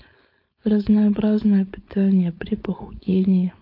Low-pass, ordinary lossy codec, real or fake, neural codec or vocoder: 5.4 kHz; AAC, 32 kbps; fake; codec, 16 kHz, 4.8 kbps, FACodec